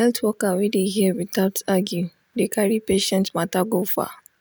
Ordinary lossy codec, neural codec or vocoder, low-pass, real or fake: none; none; none; real